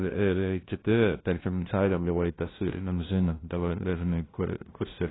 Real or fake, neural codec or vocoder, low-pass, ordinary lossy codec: fake; codec, 16 kHz, 0.5 kbps, FunCodec, trained on LibriTTS, 25 frames a second; 7.2 kHz; AAC, 16 kbps